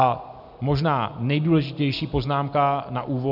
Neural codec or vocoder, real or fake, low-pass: none; real; 5.4 kHz